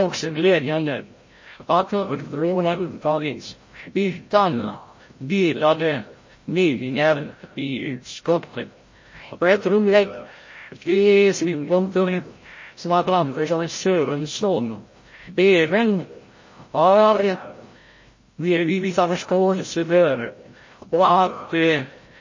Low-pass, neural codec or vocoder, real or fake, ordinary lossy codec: 7.2 kHz; codec, 16 kHz, 0.5 kbps, FreqCodec, larger model; fake; MP3, 32 kbps